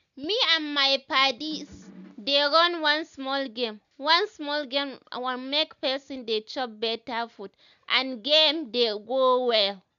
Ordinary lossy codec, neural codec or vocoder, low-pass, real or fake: none; none; 7.2 kHz; real